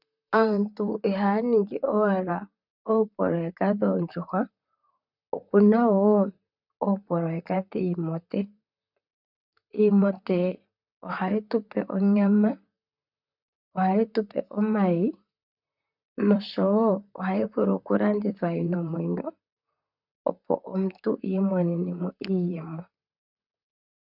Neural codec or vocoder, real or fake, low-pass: vocoder, 44.1 kHz, 128 mel bands, Pupu-Vocoder; fake; 5.4 kHz